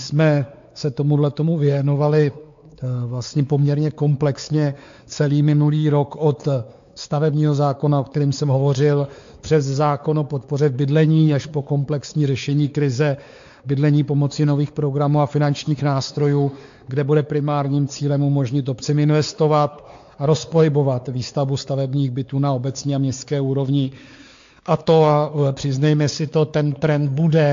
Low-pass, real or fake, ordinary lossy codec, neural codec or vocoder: 7.2 kHz; fake; AAC, 64 kbps; codec, 16 kHz, 4 kbps, X-Codec, WavLM features, trained on Multilingual LibriSpeech